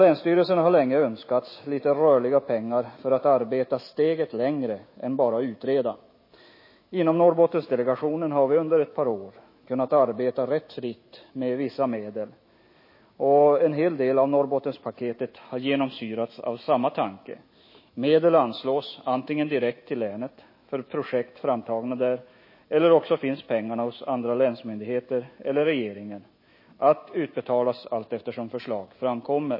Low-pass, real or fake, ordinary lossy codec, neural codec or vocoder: 5.4 kHz; fake; MP3, 24 kbps; autoencoder, 48 kHz, 128 numbers a frame, DAC-VAE, trained on Japanese speech